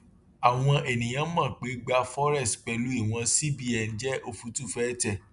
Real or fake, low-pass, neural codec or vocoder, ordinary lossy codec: real; 10.8 kHz; none; none